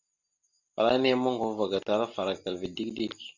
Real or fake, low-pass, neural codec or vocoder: real; 7.2 kHz; none